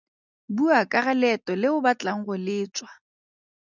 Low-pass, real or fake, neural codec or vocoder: 7.2 kHz; real; none